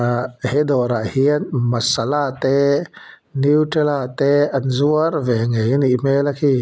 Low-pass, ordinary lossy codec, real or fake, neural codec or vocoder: none; none; real; none